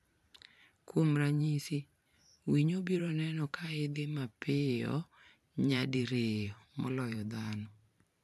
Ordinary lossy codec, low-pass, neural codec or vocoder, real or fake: MP3, 96 kbps; 14.4 kHz; vocoder, 44.1 kHz, 128 mel bands every 512 samples, BigVGAN v2; fake